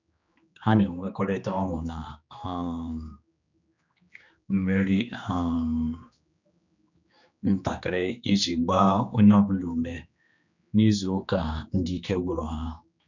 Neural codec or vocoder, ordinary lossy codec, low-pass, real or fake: codec, 16 kHz, 2 kbps, X-Codec, HuBERT features, trained on general audio; none; 7.2 kHz; fake